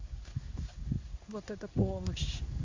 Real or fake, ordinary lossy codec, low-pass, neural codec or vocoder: fake; MP3, 64 kbps; 7.2 kHz; codec, 16 kHz in and 24 kHz out, 1 kbps, XY-Tokenizer